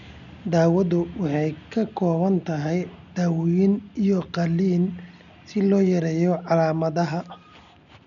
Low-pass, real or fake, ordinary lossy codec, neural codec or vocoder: 7.2 kHz; real; none; none